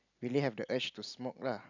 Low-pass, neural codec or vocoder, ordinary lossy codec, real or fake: 7.2 kHz; none; none; real